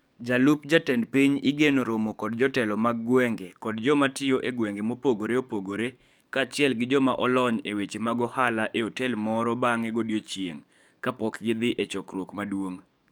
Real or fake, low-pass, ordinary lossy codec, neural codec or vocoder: fake; 19.8 kHz; none; codec, 44.1 kHz, 7.8 kbps, DAC